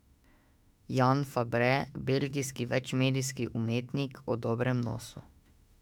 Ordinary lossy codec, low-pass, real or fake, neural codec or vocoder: none; 19.8 kHz; fake; autoencoder, 48 kHz, 32 numbers a frame, DAC-VAE, trained on Japanese speech